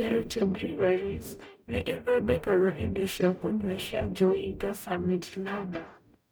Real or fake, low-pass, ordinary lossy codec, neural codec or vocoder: fake; none; none; codec, 44.1 kHz, 0.9 kbps, DAC